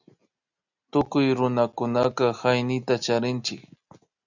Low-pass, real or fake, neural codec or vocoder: 7.2 kHz; real; none